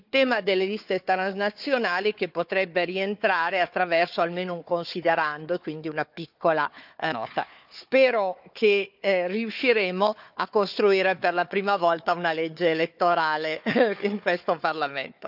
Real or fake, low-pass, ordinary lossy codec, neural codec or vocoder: fake; 5.4 kHz; none; codec, 16 kHz, 4 kbps, FunCodec, trained on Chinese and English, 50 frames a second